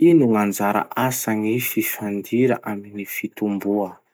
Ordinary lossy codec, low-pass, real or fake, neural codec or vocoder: none; none; real; none